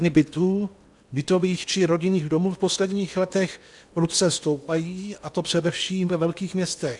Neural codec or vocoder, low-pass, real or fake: codec, 16 kHz in and 24 kHz out, 0.8 kbps, FocalCodec, streaming, 65536 codes; 10.8 kHz; fake